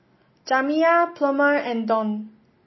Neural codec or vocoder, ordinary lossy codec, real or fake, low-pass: none; MP3, 24 kbps; real; 7.2 kHz